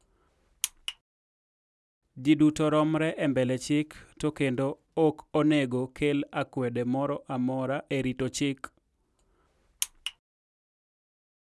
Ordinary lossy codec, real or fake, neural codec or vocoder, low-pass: none; real; none; none